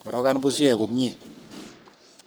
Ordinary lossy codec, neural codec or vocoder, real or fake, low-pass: none; codec, 44.1 kHz, 3.4 kbps, Pupu-Codec; fake; none